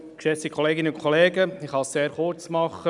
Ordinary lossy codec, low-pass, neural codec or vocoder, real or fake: none; 10.8 kHz; none; real